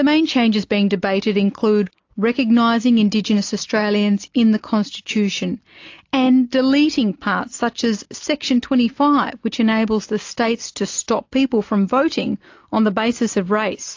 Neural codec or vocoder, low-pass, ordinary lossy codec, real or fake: none; 7.2 kHz; AAC, 48 kbps; real